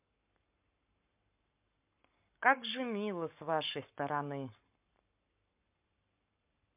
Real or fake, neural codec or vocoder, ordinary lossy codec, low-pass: fake; codec, 44.1 kHz, 7.8 kbps, Pupu-Codec; MP3, 32 kbps; 3.6 kHz